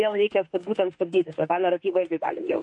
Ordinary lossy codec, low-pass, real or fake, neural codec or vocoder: MP3, 48 kbps; 10.8 kHz; fake; autoencoder, 48 kHz, 32 numbers a frame, DAC-VAE, trained on Japanese speech